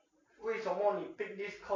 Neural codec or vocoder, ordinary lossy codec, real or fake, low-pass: none; AAC, 32 kbps; real; 7.2 kHz